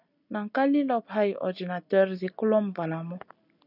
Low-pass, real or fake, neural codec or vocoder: 5.4 kHz; real; none